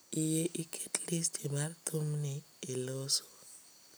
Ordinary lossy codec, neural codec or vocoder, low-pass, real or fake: none; none; none; real